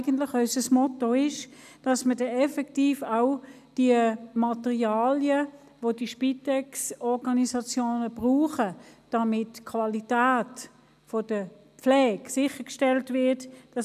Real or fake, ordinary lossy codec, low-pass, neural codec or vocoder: real; none; 14.4 kHz; none